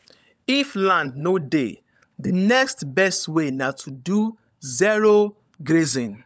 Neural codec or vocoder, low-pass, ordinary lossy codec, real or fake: codec, 16 kHz, 16 kbps, FunCodec, trained on LibriTTS, 50 frames a second; none; none; fake